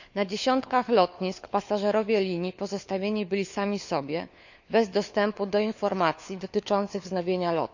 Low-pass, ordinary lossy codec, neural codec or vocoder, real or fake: 7.2 kHz; none; codec, 16 kHz, 4 kbps, FunCodec, trained on LibriTTS, 50 frames a second; fake